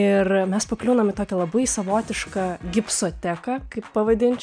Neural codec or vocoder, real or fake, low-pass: none; real; 9.9 kHz